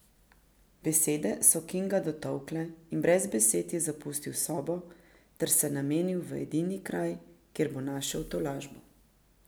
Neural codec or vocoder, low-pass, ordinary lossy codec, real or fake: none; none; none; real